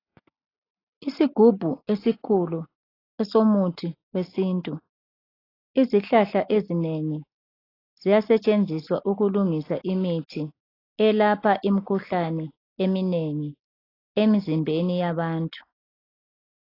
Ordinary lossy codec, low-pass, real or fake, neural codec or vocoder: AAC, 24 kbps; 5.4 kHz; real; none